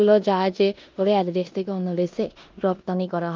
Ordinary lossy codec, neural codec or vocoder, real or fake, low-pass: Opus, 24 kbps; codec, 16 kHz in and 24 kHz out, 0.9 kbps, LongCat-Audio-Codec, four codebook decoder; fake; 7.2 kHz